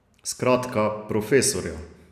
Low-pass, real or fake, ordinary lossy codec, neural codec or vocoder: 14.4 kHz; real; none; none